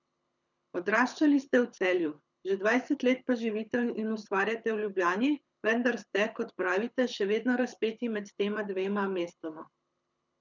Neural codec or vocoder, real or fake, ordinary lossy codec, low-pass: codec, 24 kHz, 6 kbps, HILCodec; fake; none; 7.2 kHz